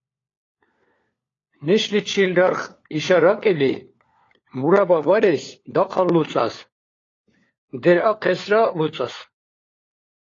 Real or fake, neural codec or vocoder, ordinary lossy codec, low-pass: fake; codec, 16 kHz, 4 kbps, FunCodec, trained on LibriTTS, 50 frames a second; AAC, 32 kbps; 7.2 kHz